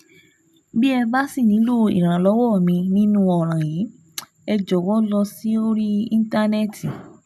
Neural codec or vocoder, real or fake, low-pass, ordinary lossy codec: none; real; 14.4 kHz; none